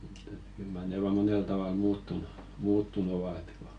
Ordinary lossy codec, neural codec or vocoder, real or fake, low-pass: Opus, 64 kbps; none; real; 9.9 kHz